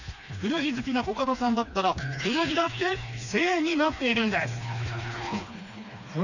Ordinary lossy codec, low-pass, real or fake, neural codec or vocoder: none; 7.2 kHz; fake; codec, 16 kHz, 2 kbps, FreqCodec, smaller model